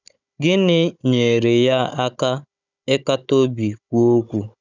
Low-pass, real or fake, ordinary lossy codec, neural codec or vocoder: 7.2 kHz; fake; none; codec, 16 kHz, 16 kbps, FunCodec, trained on Chinese and English, 50 frames a second